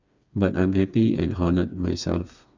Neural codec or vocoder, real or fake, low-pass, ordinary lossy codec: codec, 16 kHz, 4 kbps, FreqCodec, smaller model; fake; 7.2 kHz; Opus, 64 kbps